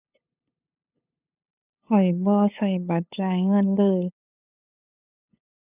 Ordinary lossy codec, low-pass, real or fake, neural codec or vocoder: none; 3.6 kHz; fake; codec, 16 kHz, 8 kbps, FunCodec, trained on LibriTTS, 25 frames a second